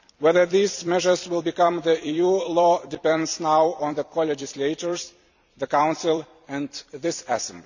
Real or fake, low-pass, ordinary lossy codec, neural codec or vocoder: fake; 7.2 kHz; none; vocoder, 44.1 kHz, 128 mel bands every 256 samples, BigVGAN v2